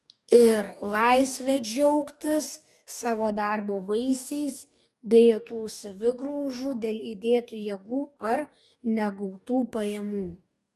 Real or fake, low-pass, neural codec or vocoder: fake; 14.4 kHz; codec, 44.1 kHz, 2.6 kbps, DAC